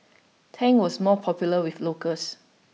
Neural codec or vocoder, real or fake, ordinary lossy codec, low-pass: none; real; none; none